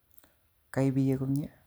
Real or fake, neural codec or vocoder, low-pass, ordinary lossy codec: real; none; none; none